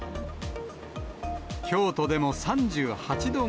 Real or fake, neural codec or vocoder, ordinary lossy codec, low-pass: real; none; none; none